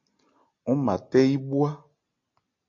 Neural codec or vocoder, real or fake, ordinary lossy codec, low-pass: none; real; AAC, 48 kbps; 7.2 kHz